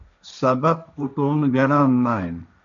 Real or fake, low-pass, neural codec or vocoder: fake; 7.2 kHz; codec, 16 kHz, 1.1 kbps, Voila-Tokenizer